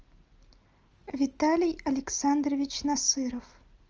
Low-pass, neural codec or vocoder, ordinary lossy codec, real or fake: 7.2 kHz; none; Opus, 24 kbps; real